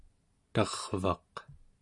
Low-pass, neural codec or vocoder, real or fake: 10.8 kHz; none; real